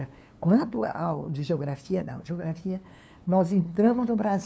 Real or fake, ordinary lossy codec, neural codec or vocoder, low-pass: fake; none; codec, 16 kHz, 2 kbps, FunCodec, trained on LibriTTS, 25 frames a second; none